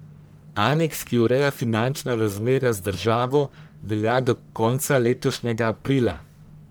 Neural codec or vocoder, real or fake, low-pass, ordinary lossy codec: codec, 44.1 kHz, 1.7 kbps, Pupu-Codec; fake; none; none